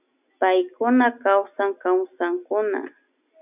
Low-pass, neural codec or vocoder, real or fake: 3.6 kHz; none; real